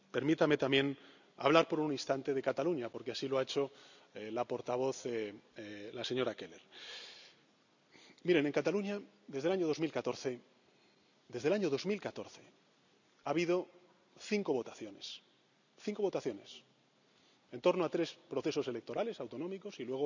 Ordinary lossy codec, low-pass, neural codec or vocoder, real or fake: none; 7.2 kHz; none; real